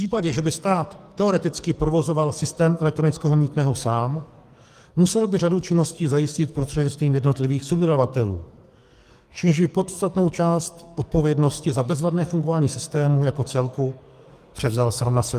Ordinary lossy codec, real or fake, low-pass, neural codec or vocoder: Opus, 32 kbps; fake; 14.4 kHz; codec, 44.1 kHz, 2.6 kbps, SNAC